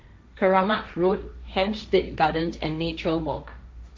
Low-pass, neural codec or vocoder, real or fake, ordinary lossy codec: none; codec, 16 kHz, 1.1 kbps, Voila-Tokenizer; fake; none